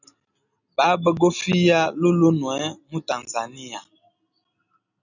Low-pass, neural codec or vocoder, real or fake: 7.2 kHz; none; real